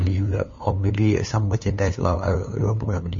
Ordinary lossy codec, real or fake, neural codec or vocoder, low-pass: MP3, 32 kbps; fake; codec, 16 kHz, 2 kbps, FunCodec, trained on LibriTTS, 25 frames a second; 7.2 kHz